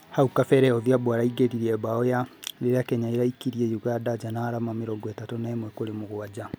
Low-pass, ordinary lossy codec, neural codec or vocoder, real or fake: none; none; none; real